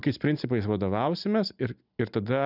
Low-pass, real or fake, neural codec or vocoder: 5.4 kHz; real; none